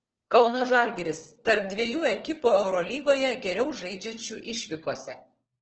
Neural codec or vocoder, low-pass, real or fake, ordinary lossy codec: codec, 16 kHz, 4 kbps, FunCodec, trained on LibriTTS, 50 frames a second; 7.2 kHz; fake; Opus, 16 kbps